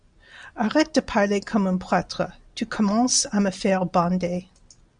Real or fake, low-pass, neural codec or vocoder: real; 9.9 kHz; none